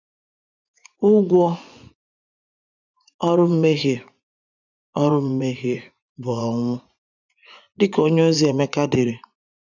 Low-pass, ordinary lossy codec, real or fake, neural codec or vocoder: 7.2 kHz; none; fake; vocoder, 24 kHz, 100 mel bands, Vocos